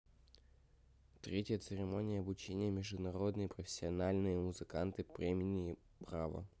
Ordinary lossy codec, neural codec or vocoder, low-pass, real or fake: none; none; none; real